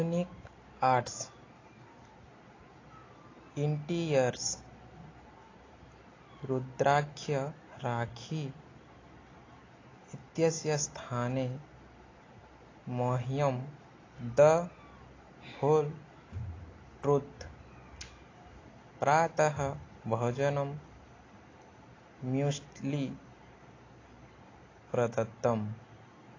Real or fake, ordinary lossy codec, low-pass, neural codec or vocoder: real; AAC, 32 kbps; 7.2 kHz; none